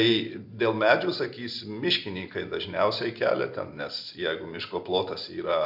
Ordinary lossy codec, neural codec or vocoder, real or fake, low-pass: AAC, 48 kbps; none; real; 5.4 kHz